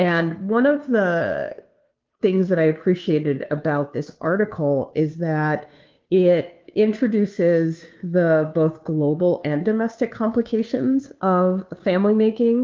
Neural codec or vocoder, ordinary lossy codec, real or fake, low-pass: codec, 16 kHz, 2 kbps, FunCodec, trained on Chinese and English, 25 frames a second; Opus, 32 kbps; fake; 7.2 kHz